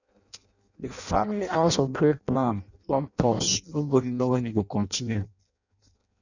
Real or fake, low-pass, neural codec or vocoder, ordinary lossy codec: fake; 7.2 kHz; codec, 16 kHz in and 24 kHz out, 0.6 kbps, FireRedTTS-2 codec; none